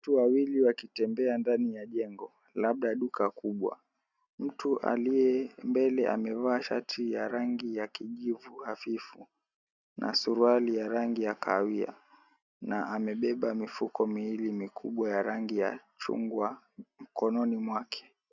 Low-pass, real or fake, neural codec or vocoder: 7.2 kHz; real; none